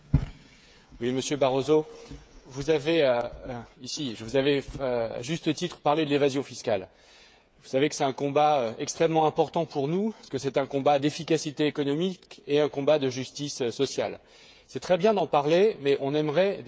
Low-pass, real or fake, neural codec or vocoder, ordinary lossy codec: none; fake; codec, 16 kHz, 8 kbps, FreqCodec, smaller model; none